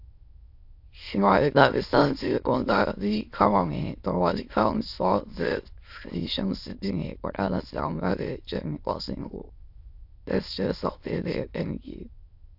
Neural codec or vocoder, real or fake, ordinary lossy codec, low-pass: autoencoder, 22.05 kHz, a latent of 192 numbers a frame, VITS, trained on many speakers; fake; AAC, 48 kbps; 5.4 kHz